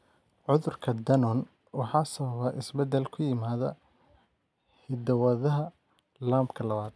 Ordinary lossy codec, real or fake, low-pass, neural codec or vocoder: none; real; none; none